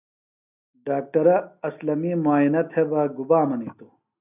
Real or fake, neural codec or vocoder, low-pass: real; none; 3.6 kHz